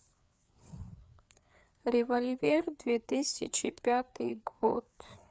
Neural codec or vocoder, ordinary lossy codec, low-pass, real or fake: codec, 16 kHz, 4 kbps, FreqCodec, larger model; none; none; fake